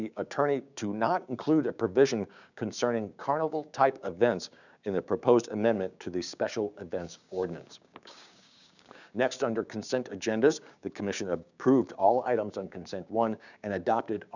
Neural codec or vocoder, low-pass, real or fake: codec, 16 kHz, 6 kbps, DAC; 7.2 kHz; fake